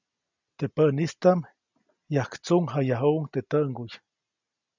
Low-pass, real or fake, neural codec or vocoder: 7.2 kHz; real; none